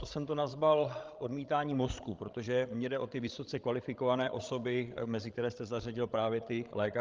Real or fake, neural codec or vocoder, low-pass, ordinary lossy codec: fake; codec, 16 kHz, 16 kbps, FreqCodec, larger model; 7.2 kHz; Opus, 32 kbps